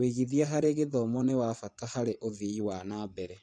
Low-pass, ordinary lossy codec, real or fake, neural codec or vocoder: 9.9 kHz; none; fake; vocoder, 24 kHz, 100 mel bands, Vocos